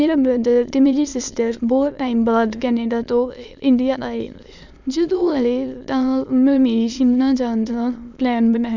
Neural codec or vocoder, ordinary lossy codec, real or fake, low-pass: autoencoder, 22.05 kHz, a latent of 192 numbers a frame, VITS, trained on many speakers; none; fake; 7.2 kHz